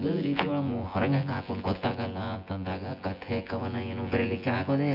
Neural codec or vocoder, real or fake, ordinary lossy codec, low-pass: vocoder, 24 kHz, 100 mel bands, Vocos; fake; none; 5.4 kHz